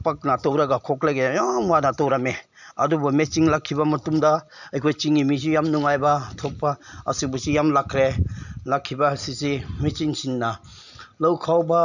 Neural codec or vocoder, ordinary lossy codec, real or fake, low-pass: none; none; real; 7.2 kHz